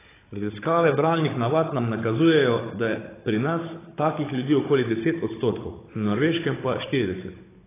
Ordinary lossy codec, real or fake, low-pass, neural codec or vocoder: AAC, 16 kbps; fake; 3.6 kHz; codec, 16 kHz, 16 kbps, FunCodec, trained on Chinese and English, 50 frames a second